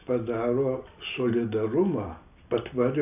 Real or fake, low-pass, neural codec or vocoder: real; 3.6 kHz; none